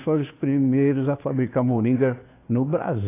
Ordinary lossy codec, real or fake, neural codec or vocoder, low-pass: AAC, 24 kbps; fake; codec, 16 kHz, 2 kbps, FunCodec, trained on Chinese and English, 25 frames a second; 3.6 kHz